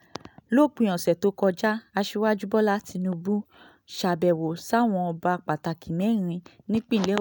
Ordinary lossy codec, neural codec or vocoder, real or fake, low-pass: none; none; real; none